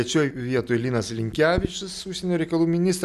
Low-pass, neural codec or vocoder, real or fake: 14.4 kHz; none; real